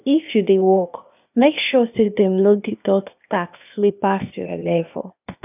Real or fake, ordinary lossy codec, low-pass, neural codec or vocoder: fake; none; 3.6 kHz; codec, 16 kHz, 0.8 kbps, ZipCodec